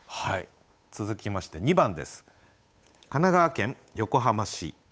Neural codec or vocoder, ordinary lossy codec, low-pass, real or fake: codec, 16 kHz, 8 kbps, FunCodec, trained on Chinese and English, 25 frames a second; none; none; fake